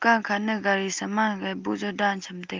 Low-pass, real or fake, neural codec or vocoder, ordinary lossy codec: 7.2 kHz; real; none; Opus, 24 kbps